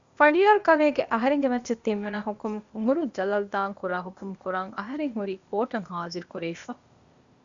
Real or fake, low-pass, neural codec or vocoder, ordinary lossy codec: fake; 7.2 kHz; codec, 16 kHz, 0.8 kbps, ZipCodec; Opus, 64 kbps